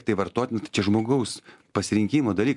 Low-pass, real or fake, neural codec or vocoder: 10.8 kHz; real; none